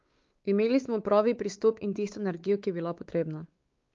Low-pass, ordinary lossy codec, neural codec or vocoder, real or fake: 7.2 kHz; Opus, 32 kbps; codec, 16 kHz, 4 kbps, X-Codec, WavLM features, trained on Multilingual LibriSpeech; fake